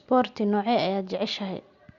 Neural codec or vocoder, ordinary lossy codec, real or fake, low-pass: none; Opus, 64 kbps; real; 7.2 kHz